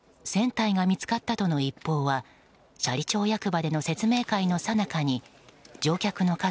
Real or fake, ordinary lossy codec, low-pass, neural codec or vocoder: real; none; none; none